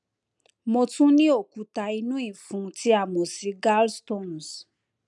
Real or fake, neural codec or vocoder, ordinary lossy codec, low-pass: real; none; none; 10.8 kHz